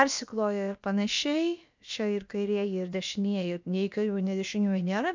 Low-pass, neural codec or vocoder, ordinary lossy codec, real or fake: 7.2 kHz; codec, 16 kHz, about 1 kbps, DyCAST, with the encoder's durations; MP3, 64 kbps; fake